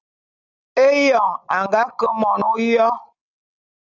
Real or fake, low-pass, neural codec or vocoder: real; 7.2 kHz; none